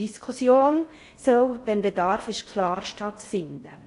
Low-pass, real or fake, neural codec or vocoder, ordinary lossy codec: 10.8 kHz; fake; codec, 16 kHz in and 24 kHz out, 0.6 kbps, FocalCodec, streaming, 4096 codes; AAC, 48 kbps